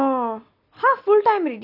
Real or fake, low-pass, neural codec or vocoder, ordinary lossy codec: real; 5.4 kHz; none; MP3, 48 kbps